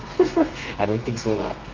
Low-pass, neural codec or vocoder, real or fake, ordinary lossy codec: 7.2 kHz; codec, 32 kHz, 1.9 kbps, SNAC; fake; Opus, 32 kbps